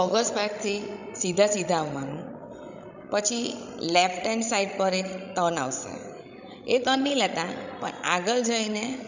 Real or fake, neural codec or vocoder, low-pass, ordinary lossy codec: fake; codec, 16 kHz, 16 kbps, FreqCodec, larger model; 7.2 kHz; none